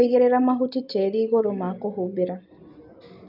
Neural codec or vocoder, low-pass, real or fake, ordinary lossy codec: none; 5.4 kHz; real; none